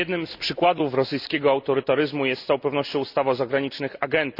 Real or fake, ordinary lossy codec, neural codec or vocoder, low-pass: real; none; none; 5.4 kHz